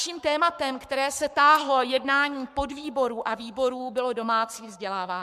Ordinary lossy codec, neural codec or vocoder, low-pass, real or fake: MP3, 96 kbps; codec, 44.1 kHz, 7.8 kbps, Pupu-Codec; 14.4 kHz; fake